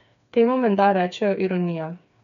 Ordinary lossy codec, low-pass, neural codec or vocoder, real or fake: none; 7.2 kHz; codec, 16 kHz, 4 kbps, FreqCodec, smaller model; fake